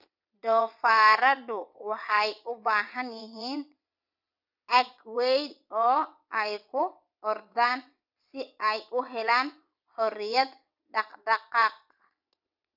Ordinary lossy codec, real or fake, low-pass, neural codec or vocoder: none; fake; 5.4 kHz; vocoder, 22.05 kHz, 80 mel bands, WaveNeXt